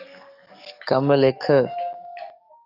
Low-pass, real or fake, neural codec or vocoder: 5.4 kHz; fake; codec, 44.1 kHz, 7.8 kbps, DAC